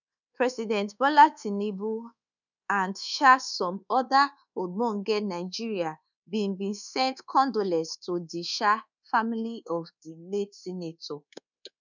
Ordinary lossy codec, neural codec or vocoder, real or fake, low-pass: none; codec, 24 kHz, 1.2 kbps, DualCodec; fake; 7.2 kHz